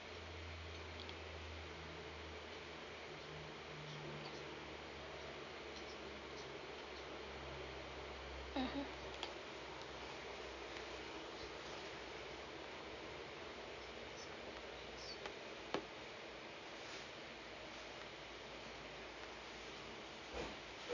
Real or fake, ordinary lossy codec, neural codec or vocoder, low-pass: real; none; none; 7.2 kHz